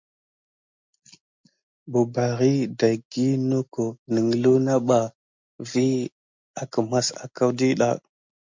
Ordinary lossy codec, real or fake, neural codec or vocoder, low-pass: MP3, 64 kbps; real; none; 7.2 kHz